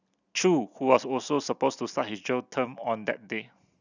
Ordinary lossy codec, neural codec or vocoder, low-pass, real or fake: none; none; 7.2 kHz; real